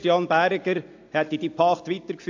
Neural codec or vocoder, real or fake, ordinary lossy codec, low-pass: none; real; AAC, 48 kbps; 7.2 kHz